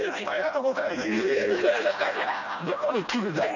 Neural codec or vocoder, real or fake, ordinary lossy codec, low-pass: codec, 16 kHz, 1 kbps, FreqCodec, smaller model; fake; none; 7.2 kHz